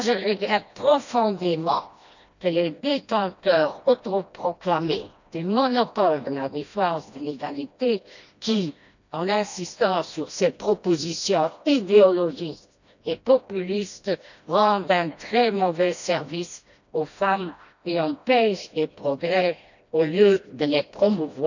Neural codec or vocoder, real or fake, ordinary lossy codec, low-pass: codec, 16 kHz, 1 kbps, FreqCodec, smaller model; fake; none; 7.2 kHz